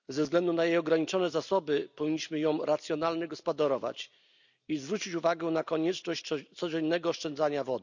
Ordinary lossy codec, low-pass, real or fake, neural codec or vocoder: none; 7.2 kHz; real; none